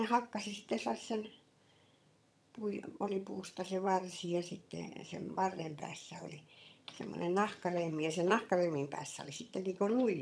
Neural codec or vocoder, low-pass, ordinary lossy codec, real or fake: vocoder, 22.05 kHz, 80 mel bands, HiFi-GAN; none; none; fake